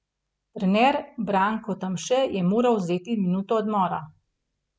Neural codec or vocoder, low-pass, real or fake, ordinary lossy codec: none; none; real; none